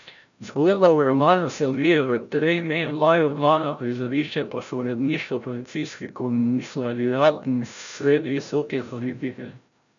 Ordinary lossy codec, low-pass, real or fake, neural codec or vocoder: none; 7.2 kHz; fake; codec, 16 kHz, 0.5 kbps, FreqCodec, larger model